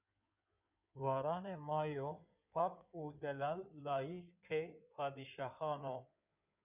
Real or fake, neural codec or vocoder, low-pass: fake; codec, 16 kHz in and 24 kHz out, 2.2 kbps, FireRedTTS-2 codec; 3.6 kHz